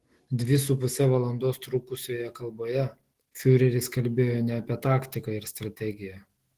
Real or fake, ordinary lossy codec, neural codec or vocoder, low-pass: fake; Opus, 16 kbps; autoencoder, 48 kHz, 128 numbers a frame, DAC-VAE, trained on Japanese speech; 14.4 kHz